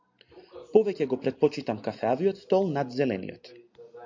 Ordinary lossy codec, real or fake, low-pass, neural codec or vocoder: MP3, 48 kbps; real; 7.2 kHz; none